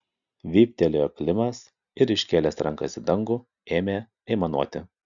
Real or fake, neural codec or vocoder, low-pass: real; none; 7.2 kHz